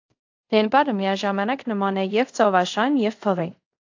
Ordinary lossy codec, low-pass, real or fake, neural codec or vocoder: AAC, 48 kbps; 7.2 kHz; fake; codec, 24 kHz, 0.5 kbps, DualCodec